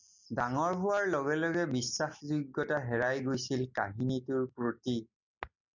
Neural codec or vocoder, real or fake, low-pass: none; real; 7.2 kHz